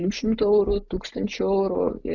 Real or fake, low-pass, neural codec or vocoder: real; 7.2 kHz; none